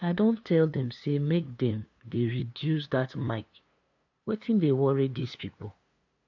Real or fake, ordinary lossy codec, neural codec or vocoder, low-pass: fake; AAC, 48 kbps; codec, 16 kHz, 2 kbps, FunCodec, trained on LibriTTS, 25 frames a second; 7.2 kHz